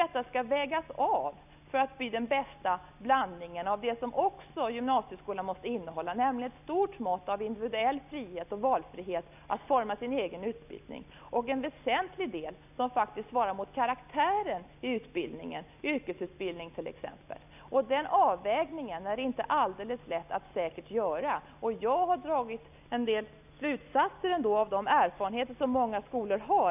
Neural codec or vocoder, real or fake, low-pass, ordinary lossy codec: none; real; 3.6 kHz; none